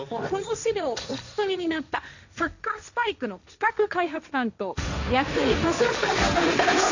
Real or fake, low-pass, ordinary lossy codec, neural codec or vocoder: fake; 7.2 kHz; none; codec, 16 kHz, 1.1 kbps, Voila-Tokenizer